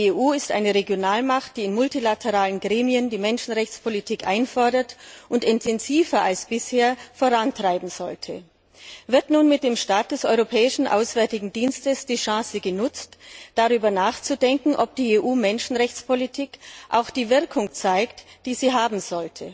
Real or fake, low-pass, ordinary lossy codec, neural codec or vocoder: real; none; none; none